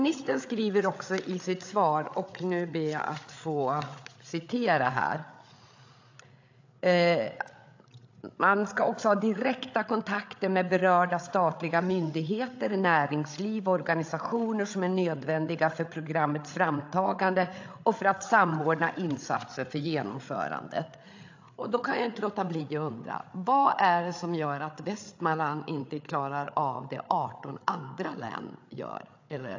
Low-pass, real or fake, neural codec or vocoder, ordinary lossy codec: 7.2 kHz; fake; codec, 16 kHz, 8 kbps, FreqCodec, larger model; AAC, 48 kbps